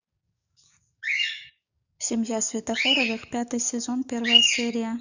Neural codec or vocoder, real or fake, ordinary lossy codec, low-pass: codec, 44.1 kHz, 7.8 kbps, DAC; fake; none; 7.2 kHz